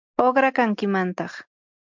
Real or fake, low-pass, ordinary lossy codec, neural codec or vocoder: real; 7.2 kHz; MP3, 64 kbps; none